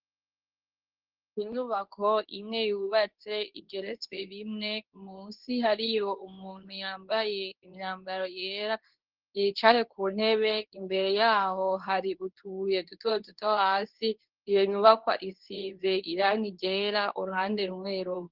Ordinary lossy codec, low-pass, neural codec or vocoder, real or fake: Opus, 16 kbps; 5.4 kHz; codec, 24 kHz, 0.9 kbps, WavTokenizer, medium speech release version 2; fake